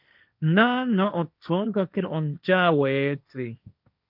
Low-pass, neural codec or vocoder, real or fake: 5.4 kHz; codec, 16 kHz, 1.1 kbps, Voila-Tokenizer; fake